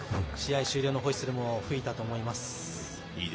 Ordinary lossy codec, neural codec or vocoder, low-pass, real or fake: none; none; none; real